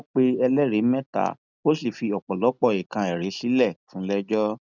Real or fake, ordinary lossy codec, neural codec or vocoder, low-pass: real; none; none; 7.2 kHz